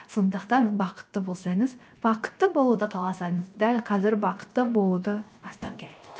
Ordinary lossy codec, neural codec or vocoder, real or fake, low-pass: none; codec, 16 kHz, 0.7 kbps, FocalCodec; fake; none